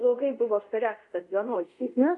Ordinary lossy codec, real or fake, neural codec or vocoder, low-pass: MP3, 64 kbps; fake; codec, 24 kHz, 0.5 kbps, DualCodec; 10.8 kHz